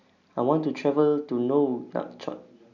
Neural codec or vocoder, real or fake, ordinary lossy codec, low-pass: none; real; none; 7.2 kHz